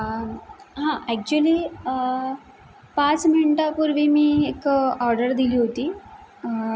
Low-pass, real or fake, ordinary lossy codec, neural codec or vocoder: none; real; none; none